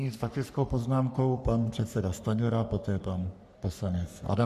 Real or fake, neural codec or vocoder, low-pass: fake; codec, 44.1 kHz, 3.4 kbps, Pupu-Codec; 14.4 kHz